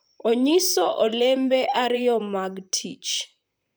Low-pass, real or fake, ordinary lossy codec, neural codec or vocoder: none; fake; none; vocoder, 44.1 kHz, 128 mel bands, Pupu-Vocoder